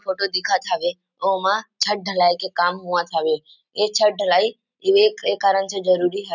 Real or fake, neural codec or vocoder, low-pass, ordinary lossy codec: real; none; 7.2 kHz; none